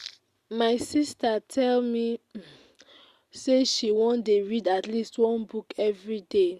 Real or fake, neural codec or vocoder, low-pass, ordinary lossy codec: real; none; 14.4 kHz; Opus, 64 kbps